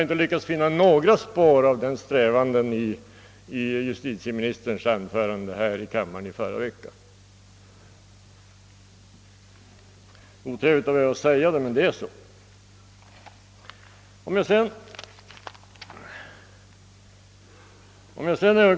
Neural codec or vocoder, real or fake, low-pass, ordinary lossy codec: none; real; none; none